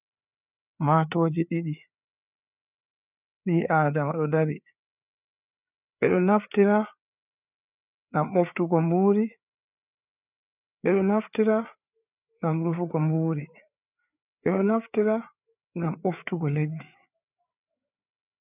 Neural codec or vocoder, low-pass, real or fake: codec, 16 kHz, 4 kbps, FreqCodec, larger model; 3.6 kHz; fake